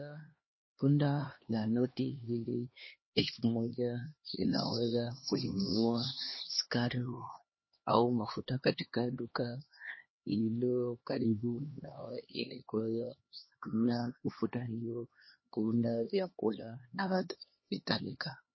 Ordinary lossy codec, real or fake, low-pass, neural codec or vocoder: MP3, 24 kbps; fake; 7.2 kHz; codec, 16 kHz, 2 kbps, X-Codec, HuBERT features, trained on LibriSpeech